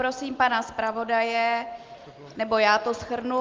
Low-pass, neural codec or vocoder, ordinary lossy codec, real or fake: 7.2 kHz; none; Opus, 32 kbps; real